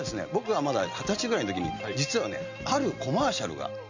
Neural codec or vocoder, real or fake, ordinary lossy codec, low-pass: none; real; none; 7.2 kHz